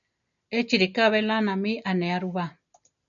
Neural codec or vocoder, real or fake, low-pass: none; real; 7.2 kHz